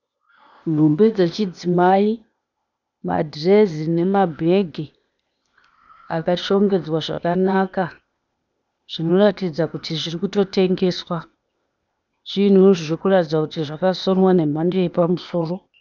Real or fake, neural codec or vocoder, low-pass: fake; codec, 16 kHz, 0.8 kbps, ZipCodec; 7.2 kHz